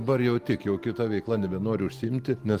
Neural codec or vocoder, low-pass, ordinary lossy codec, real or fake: none; 14.4 kHz; Opus, 24 kbps; real